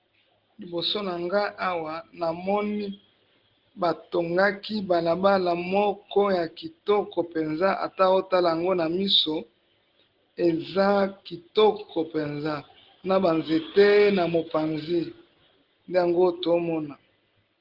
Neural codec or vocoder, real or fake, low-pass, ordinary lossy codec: none; real; 5.4 kHz; Opus, 16 kbps